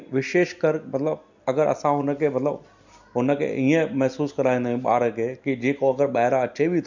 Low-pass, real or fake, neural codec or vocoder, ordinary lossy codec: 7.2 kHz; real; none; MP3, 64 kbps